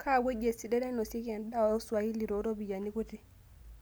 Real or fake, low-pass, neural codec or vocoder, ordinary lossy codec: real; none; none; none